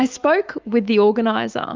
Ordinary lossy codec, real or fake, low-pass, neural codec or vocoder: Opus, 24 kbps; fake; 7.2 kHz; autoencoder, 48 kHz, 128 numbers a frame, DAC-VAE, trained on Japanese speech